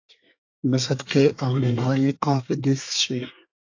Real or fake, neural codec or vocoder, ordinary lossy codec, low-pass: fake; codec, 24 kHz, 1 kbps, SNAC; AAC, 48 kbps; 7.2 kHz